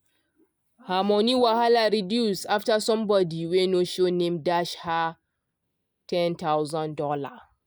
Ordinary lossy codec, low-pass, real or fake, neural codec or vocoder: none; none; real; none